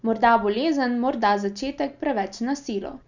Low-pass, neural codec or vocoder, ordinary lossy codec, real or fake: 7.2 kHz; none; none; real